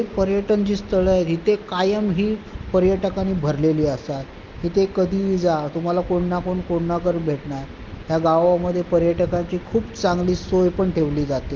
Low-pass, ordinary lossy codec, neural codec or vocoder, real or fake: 7.2 kHz; Opus, 32 kbps; none; real